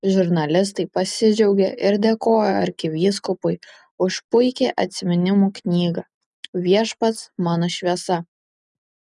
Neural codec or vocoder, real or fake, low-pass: none; real; 10.8 kHz